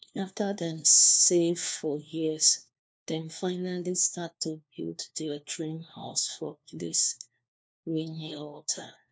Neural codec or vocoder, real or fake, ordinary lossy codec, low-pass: codec, 16 kHz, 1 kbps, FunCodec, trained on LibriTTS, 50 frames a second; fake; none; none